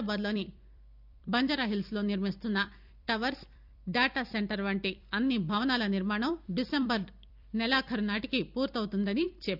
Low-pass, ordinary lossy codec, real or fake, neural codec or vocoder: 5.4 kHz; none; fake; vocoder, 44.1 kHz, 128 mel bands every 256 samples, BigVGAN v2